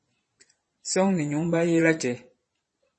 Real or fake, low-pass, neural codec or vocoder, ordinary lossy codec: fake; 9.9 kHz; vocoder, 22.05 kHz, 80 mel bands, Vocos; MP3, 32 kbps